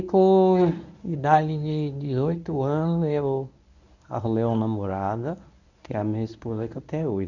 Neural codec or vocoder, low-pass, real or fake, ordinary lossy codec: codec, 24 kHz, 0.9 kbps, WavTokenizer, medium speech release version 1; 7.2 kHz; fake; none